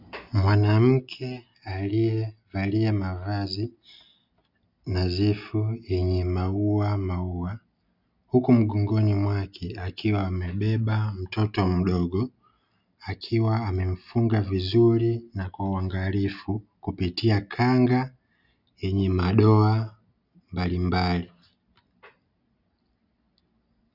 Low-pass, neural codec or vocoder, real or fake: 5.4 kHz; none; real